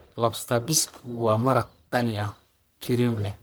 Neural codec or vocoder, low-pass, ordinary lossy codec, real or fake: codec, 44.1 kHz, 1.7 kbps, Pupu-Codec; none; none; fake